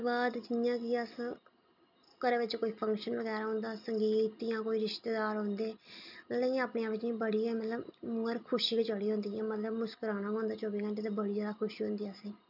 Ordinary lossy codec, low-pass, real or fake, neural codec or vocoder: none; 5.4 kHz; real; none